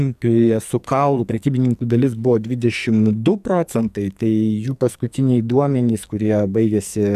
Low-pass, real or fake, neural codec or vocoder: 14.4 kHz; fake; codec, 44.1 kHz, 2.6 kbps, SNAC